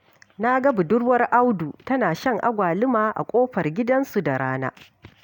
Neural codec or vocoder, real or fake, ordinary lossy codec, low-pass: none; real; none; 19.8 kHz